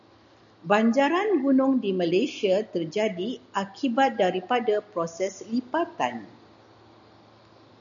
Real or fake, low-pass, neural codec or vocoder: real; 7.2 kHz; none